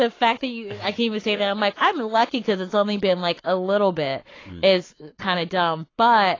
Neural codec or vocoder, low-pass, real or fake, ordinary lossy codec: codec, 44.1 kHz, 7.8 kbps, Pupu-Codec; 7.2 kHz; fake; AAC, 32 kbps